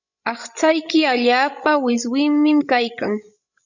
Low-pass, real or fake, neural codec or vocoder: 7.2 kHz; fake; codec, 16 kHz, 16 kbps, FreqCodec, larger model